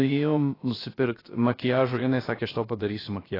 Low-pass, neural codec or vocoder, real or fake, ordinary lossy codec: 5.4 kHz; codec, 16 kHz, about 1 kbps, DyCAST, with the encoder's durations; fake; AAC, 24 kbps